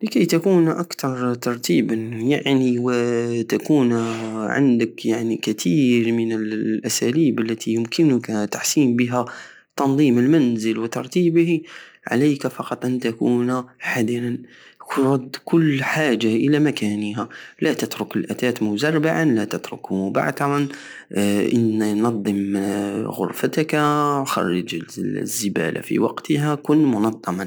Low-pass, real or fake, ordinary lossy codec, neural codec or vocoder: none; real; none; none